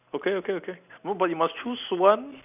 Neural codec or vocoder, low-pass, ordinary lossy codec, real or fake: none; 3.6 kHz; none; real